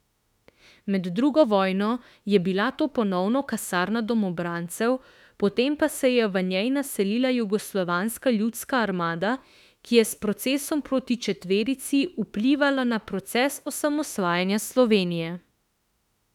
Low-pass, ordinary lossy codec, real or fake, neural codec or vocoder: 19.8 kHz; none; fake; autoencoder, 48 kHz, 32 numbers a frame, DAC-VAE, trained on Japanese speech